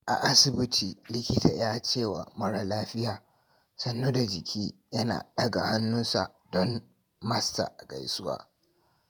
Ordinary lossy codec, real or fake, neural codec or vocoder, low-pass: none; fake; vocoder, 48 kHz, 128 mel bands, Vocos; none